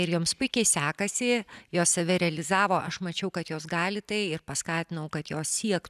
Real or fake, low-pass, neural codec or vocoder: real; 14.4 kHz; none